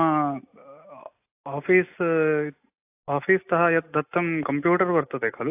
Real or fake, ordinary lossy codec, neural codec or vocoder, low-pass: real; AAC, 24 kbps; none; 3.6 kHz